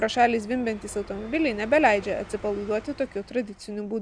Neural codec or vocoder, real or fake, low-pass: none; real; 9.9 kHz